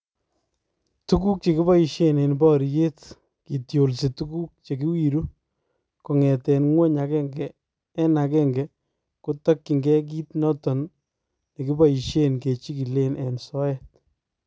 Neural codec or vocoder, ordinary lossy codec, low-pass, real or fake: none; none; none; real